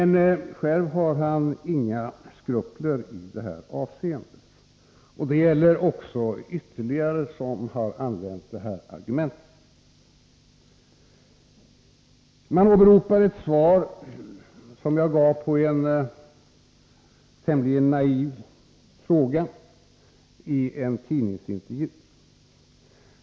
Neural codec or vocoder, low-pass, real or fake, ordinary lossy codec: none; none; real; none